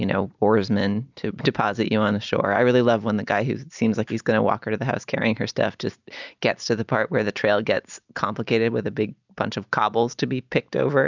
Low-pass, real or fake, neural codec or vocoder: 7.2 kHz; real; none